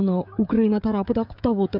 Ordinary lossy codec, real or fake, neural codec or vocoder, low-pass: none; fake; codec, 16 kHz, 16 kbps, FreqCodec, smaller model; 5.4 kHz